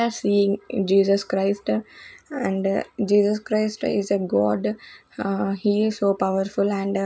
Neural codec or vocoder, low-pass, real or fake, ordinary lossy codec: none; none; real; none